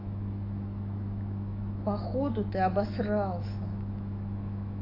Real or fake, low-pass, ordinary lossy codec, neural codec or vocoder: fake; 5.4 kHz; AAC, 24 kbps; autoencoder, 48 kHz, 128 numbers a frame, DAC-VAE, trained on Japanese speech